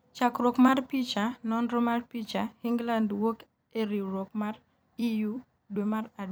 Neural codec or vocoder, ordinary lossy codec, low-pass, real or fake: vocoder, 44.1 kHz, 128 mel bands every 256 samples, BigVGAN v2; none; none; fake